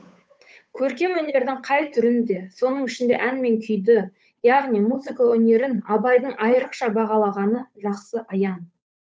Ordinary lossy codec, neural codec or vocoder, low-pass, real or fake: none; codec, 16 kHz, 8 kbps, FunCodec, trained on Chinese and English, 25 frames a second; none; fake